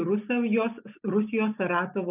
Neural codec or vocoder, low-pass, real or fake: none; 3.6 kHz; real